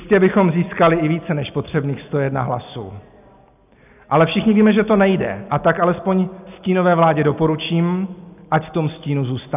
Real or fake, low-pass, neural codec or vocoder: real; 3.6 kHz; none